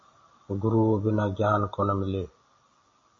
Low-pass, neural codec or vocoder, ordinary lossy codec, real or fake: 7.2 kHz; none; MP3, 32 kbps; real